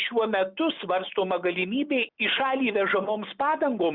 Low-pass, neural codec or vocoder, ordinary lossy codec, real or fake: 5.4 kHz; none; Opus, 64 kbps; real